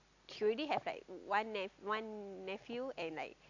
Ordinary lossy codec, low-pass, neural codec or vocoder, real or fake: AAC, 48 kbps; 7.2 kHz; none; real